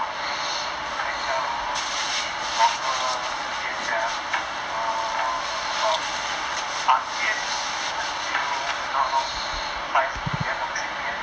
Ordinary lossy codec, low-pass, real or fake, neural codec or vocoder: none; none; real; none